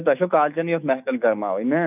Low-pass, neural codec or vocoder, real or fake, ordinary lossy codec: 3.6 kHz; autoencoder, 48 kHz, 128 numbers a frame, DAC-VAE, trained on Japanese speech; fake; none